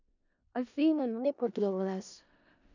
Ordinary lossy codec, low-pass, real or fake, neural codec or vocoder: none; 7.2 kHz; fake; codec, 16 kHz in and 24 kHz out, 0.4 kbps, LongCat-Audio-Codec, four codebook decoder